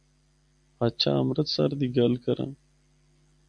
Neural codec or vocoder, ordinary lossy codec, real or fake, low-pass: none; AAC, 64 kbps; real; 9.9 kHz